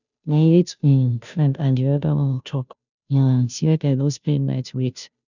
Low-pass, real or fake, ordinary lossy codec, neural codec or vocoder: 7.2 kHz; fake; none; codec, 16 kHz, 0.5 kbps, FunCodec, trained on Chinese and English, 25 frames a second